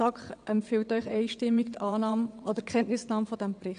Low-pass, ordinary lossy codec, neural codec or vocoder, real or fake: 9.9 kHz; AAC, 96 kbps; vocoder, 22.05 kHz, 80 mel bands, WaveNeXt; fake